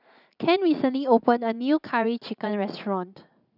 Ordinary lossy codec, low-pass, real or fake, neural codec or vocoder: none; 5.4 kHz; fake; vocoder, 44.1 kHz, 80 mel bands, Vocos